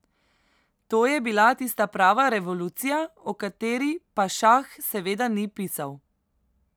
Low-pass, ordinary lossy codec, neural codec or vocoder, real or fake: none; none; none; real